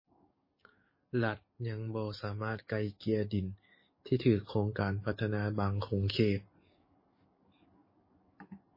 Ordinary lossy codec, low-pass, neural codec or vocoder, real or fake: MP3, 24 kbps; 5.4 kHz; codec, 44.1 kHz, 7.8 kbps, DAC; fake